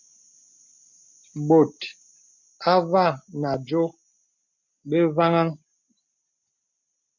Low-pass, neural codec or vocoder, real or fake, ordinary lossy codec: 7.2 kHz; none; real; MP3, 48 kbps